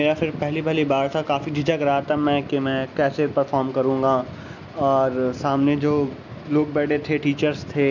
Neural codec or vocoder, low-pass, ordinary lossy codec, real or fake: none; 7.2 kHz; none; real